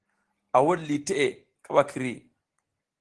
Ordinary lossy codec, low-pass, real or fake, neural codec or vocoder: Opus, 16 kbps; 10.8 kHz; real; none